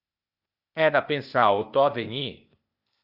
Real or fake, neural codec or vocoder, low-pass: fake; codec, 16 kHz, 0.8 kbps, ZipCodec; 5.4 kHz